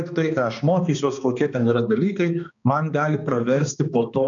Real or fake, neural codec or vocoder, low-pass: fake; codec, 16 kHz, 2 kbps, X-Codec, HuBERT features, trained on balanced general audio; 7.2 kHz